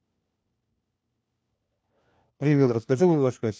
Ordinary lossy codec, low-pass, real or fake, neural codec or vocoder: none; none; fake; codec, 16 kHz, 1 kbps, FunCodec, trained on LibriTTS, 50 frames a second